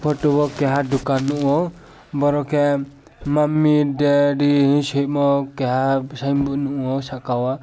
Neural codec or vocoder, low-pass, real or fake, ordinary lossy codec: none; none; real; none